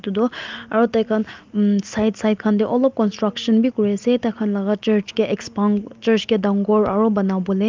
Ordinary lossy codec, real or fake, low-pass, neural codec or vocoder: Opus, 32 kbps; real; 7.2 kHz; none